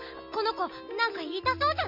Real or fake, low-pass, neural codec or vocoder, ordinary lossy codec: real; 5.4 kHz; none; none